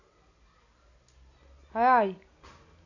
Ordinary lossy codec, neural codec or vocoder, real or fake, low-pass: none; none; real; 7.2 kHz